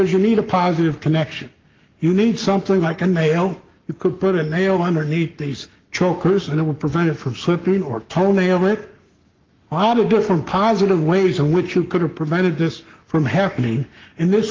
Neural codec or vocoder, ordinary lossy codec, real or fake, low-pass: codec, 44.1 kHz, 7.8 kbps, Pupu-Codec; Opus, 24 kbps; fake; 7.2 kHz